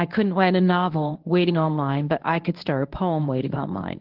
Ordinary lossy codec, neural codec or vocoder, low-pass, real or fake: Opus, 16 kbps; codec, 24 kHz, 0.9 kbps, WavTokenizer, medium speech release version 1; 5.4 kHz; fake